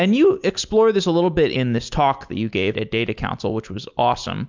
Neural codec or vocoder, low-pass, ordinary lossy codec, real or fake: none; 7.2 kHz; MP3, 64 kbps; real